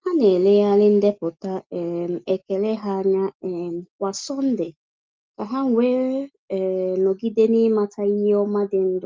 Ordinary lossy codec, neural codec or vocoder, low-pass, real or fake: Opus, 32 kbps; none; 7.2 kHz; real